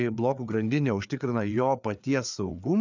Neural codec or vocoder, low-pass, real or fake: codec, 16 kHz, 4 kbps, FreqCodec, larger model; 7.2 kHz; fake